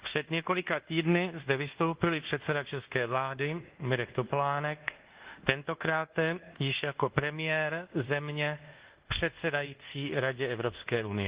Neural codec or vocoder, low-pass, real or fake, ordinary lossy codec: codec, 24 kHz, 1.2 kbps, DualCodec; 3.6 kHz; fake; Opus, 16 kbps